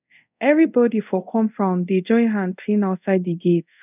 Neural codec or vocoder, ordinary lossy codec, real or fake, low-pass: codec, 24 kHz, 0.5 kbps, DualCodec; none; fake; 3.6 kHz